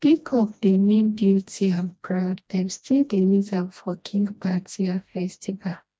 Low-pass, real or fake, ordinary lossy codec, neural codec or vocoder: none; fake; none; codec, 16 kHz, 1 kbps, FreqCodec, smaller model